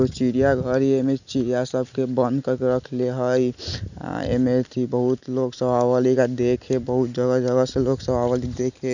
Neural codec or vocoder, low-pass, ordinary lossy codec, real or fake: none; 7.2 kHz; none; real